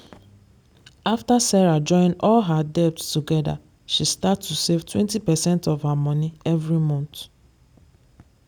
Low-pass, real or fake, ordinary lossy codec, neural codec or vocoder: none; real; none; none